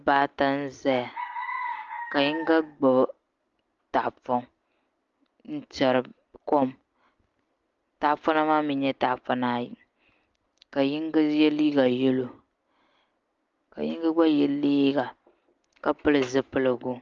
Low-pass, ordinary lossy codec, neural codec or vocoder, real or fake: 7.2 kHz; Opus, 32 kbps; none; real